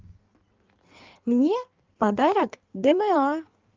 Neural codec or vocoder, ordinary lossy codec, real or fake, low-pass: codec, 16 kHz in and 24 kHz out, 1.1 kbps, FireRedTTS-2 codec; Opus, 32 kbps; fake; 7.2 kHz